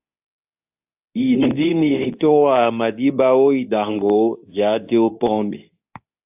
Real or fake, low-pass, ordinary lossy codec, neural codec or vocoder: fake; 3.6 kHz; AAC, 32 kbps; codec, 24 kHz, 0.9 kbps, WavTokenizer, medium speech release version 2